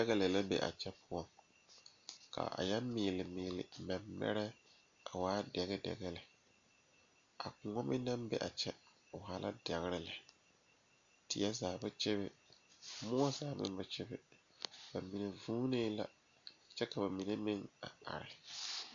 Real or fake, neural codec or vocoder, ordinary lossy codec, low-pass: real; none; MP3, 64 kbps; 7.2 kHz